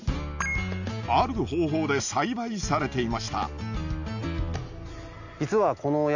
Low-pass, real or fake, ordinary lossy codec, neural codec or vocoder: 7.2 kHz; real; none; none